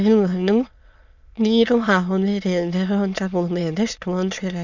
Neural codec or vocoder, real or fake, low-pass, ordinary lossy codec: autoencoder, 22.05 kHz, a latent of 192 numbers a frame, VITS, trained on many speakers; fake; 7.2 kHz; none